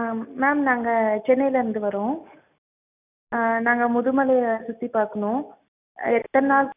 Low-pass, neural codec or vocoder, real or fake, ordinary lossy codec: 3.6 kHz; none; real; none